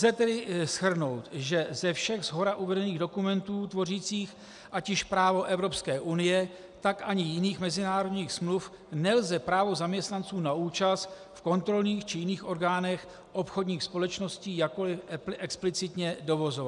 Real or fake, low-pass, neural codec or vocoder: real; 10.8 kHz; none